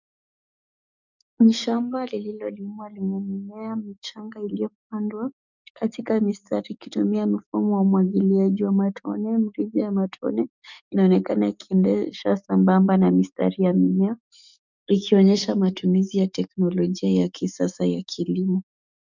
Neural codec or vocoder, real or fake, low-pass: codec, 44.1 kHz, 7.8 kbps, DAC; fake; 7.2 kHz